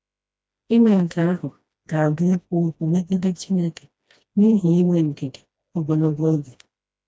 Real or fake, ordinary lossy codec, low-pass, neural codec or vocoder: fake; none; none; codec, 16 kHz, 1 kbps, FreqCodec, smaller model